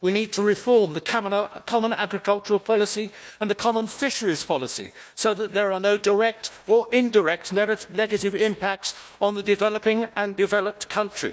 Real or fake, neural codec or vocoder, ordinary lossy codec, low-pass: fake; codec, 16 kHz, 1 kbps, FunCodec, trained on Chinese and English, 50 frames a second; none; none